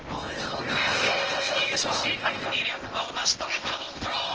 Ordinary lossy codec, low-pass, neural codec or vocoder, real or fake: Opus, 16 kbps; 7.2 kHz; codec, 16 kHz in and 24 kHz out, 0.8 kbps, FocalCodec, streaming, 65536 codes; fake